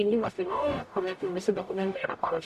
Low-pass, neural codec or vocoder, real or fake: 14.4 kHz; codec, 44.1 kHz, 0.9 kbps, DAC; fake